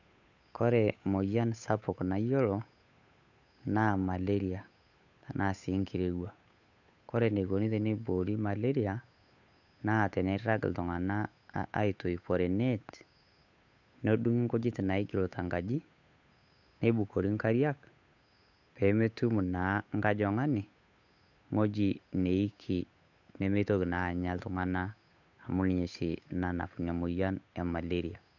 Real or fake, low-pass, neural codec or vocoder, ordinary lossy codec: fake; 7.2 kHz; codec, 16 kHz, 8 kbps, FunCodec, trained on Chinese and English, 25 frames a second; none